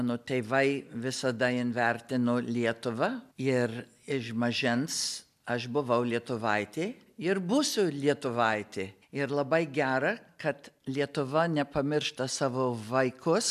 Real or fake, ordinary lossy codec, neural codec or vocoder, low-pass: real; AAC, 96 kbps; none; 14.4 kHz